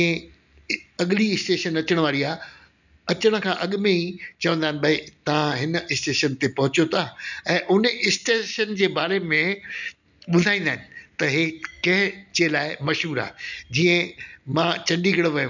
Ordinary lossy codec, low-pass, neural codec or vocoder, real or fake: none; 7.2 kHz; none; real